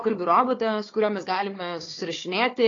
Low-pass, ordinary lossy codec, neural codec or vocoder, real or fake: 7.2 kHz; AAC, 32 kbps; codec, 16 kHz, 4 kbps, FunCodec, trained on Chinese and English, 50 frames a second; fake